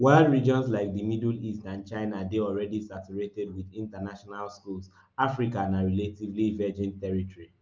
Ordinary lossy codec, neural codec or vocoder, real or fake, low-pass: Opus, 24 kbps; none; real; 7.2 kHz